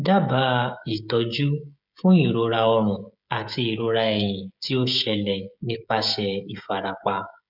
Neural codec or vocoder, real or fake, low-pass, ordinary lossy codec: codec, 16 kHz, 16 kbps, FreqCodec, smaller model; fake; 5.4 kHz; none